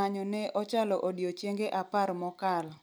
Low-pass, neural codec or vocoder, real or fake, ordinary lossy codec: none; none; real; none